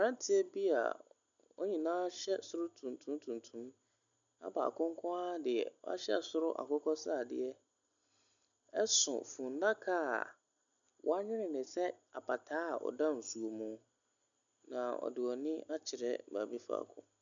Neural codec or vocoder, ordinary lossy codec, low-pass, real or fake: none; MP3, 96 kbps; 7.2 kHz; real